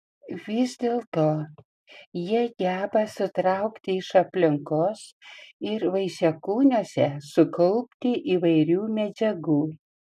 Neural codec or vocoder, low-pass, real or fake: none; 14.4 kHz; real